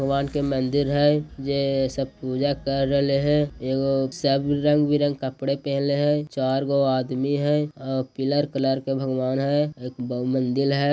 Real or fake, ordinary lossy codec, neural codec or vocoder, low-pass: real; none; none; none